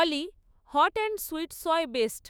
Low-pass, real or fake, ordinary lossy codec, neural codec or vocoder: none; fake; none; autoencoder, 48 kHz, 128 numbers a frame, DAC-VAE, trained on Japanese speech